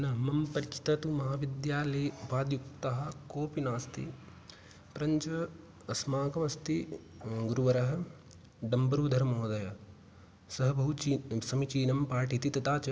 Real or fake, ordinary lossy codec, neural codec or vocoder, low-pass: real; none; none; none